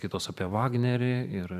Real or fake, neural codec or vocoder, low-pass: fake; vocoder, 44.1 kHz, 128 mel bands every 512 samples, BigVGAN v2; 14.4 kHz